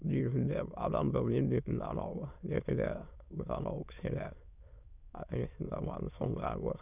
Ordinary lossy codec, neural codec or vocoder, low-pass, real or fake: none; autoencoder, 22.05 kHz, a latent of 192 numbers a frame, VITS, trained on many speakers; 3.6 kHz; fake